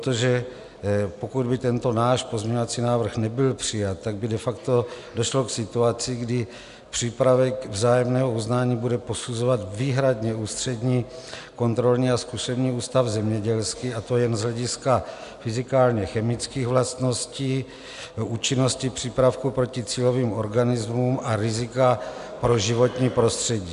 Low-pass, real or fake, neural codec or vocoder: 10.8 kHz; real; none